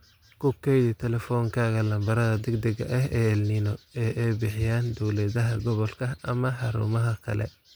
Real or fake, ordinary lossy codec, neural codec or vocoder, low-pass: real; none; none; none